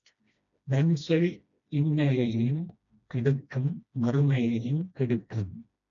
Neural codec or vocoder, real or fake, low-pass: codec, 16 kHz, 1 kbps, FreqCodec, smaller model; fake; 7.2 kHz